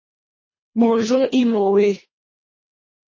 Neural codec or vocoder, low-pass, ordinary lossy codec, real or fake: codec, 24 kHz, 1.5 kbps, HILCodec; 7.2 kHz; MP3, 32 kbps; fake